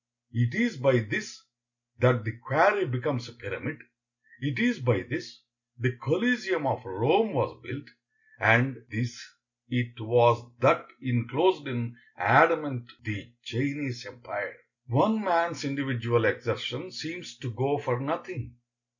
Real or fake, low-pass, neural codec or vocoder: real; 7.2 kHz; none